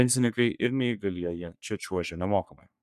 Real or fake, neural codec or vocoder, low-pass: fake; codec, 44.1 kHz, 3.4 kbps, Pupu-Codec; 14.4 kHz